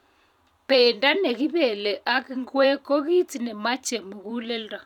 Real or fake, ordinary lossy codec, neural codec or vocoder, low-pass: fake; none; vocoder, 44.1 kHz, 128 mel bands every 512 samples, BigVGAN v2; 19.8 kHz